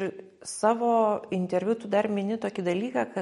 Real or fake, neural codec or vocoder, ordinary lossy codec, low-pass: real; none; MP3, 48 kbps; 19.8 kHz